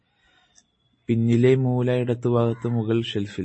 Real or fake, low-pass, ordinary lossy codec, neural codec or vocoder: real; 9.9 kHz; MP3, 32 kbps; none